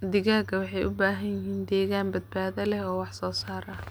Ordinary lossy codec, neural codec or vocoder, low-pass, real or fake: none; none; none; real